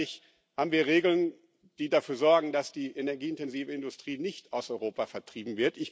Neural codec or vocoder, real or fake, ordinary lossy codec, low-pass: none; real; none; none